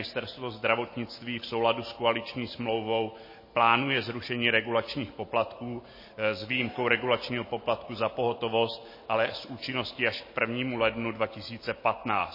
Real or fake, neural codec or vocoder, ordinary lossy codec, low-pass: real; none; MP3, 24 kbps; 5.4 kHz